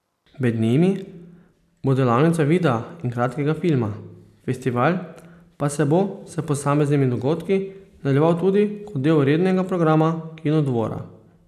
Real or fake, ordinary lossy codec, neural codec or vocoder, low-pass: real; none; none; 14.4 kHz